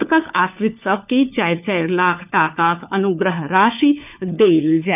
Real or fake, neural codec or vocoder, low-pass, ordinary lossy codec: fake; codec, 16 kHz, 4 kbps, FunCodec, trained on LibriTTS, 50 frames a second; 3.6 kHz; none